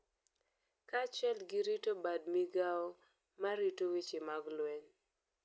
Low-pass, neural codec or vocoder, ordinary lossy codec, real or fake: none; none; none; real